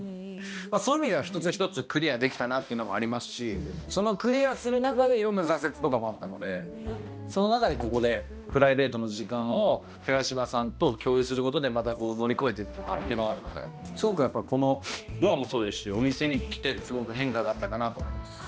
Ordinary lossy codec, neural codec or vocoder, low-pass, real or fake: none; codec, 16 kHz, 1 kbps, X-Codec, HuBERT features, trained on balanced general audio; none; fake